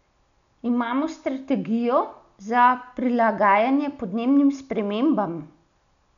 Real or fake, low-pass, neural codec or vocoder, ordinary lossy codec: real; 7.2 kHz; none; none